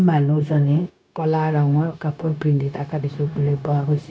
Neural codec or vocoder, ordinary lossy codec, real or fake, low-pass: codec, 16 kHz, 0.9 kbps, LongCat-Audio-Codec; none; fake; none